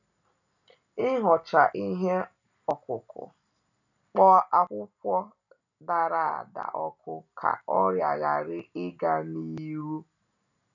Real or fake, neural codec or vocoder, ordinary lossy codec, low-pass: real; none; none; 7.2 kHz